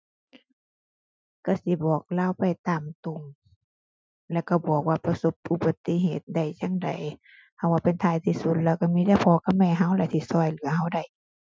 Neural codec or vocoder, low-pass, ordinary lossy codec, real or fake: none; none; none; real